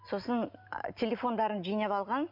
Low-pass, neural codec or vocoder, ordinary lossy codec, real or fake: 5.4 kHz; none; none; real